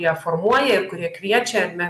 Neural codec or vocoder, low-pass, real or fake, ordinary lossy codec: none; 14.4 kHz; real; AAC, 96 kbps